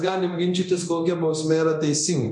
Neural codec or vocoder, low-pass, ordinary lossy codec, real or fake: codec, 24 kHz, 0.9 kbps, DualCodec; 10.8 kHz; MP3, 64 kbps; fake